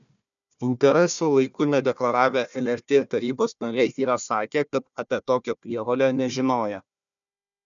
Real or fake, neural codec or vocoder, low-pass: fake; codec, 16 kHz, 1 kbps, FunCodec, trained on Chinese and English, 50 frames a second; 7.2 kHz